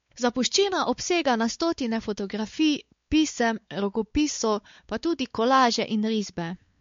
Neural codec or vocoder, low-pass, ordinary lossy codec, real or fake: codec, 16 kHz, 4 kbps, X-Codec, WavLM features, trained on Multilingual LibriSpeech; 7.2 kHz; MP3, 48 kbps; fake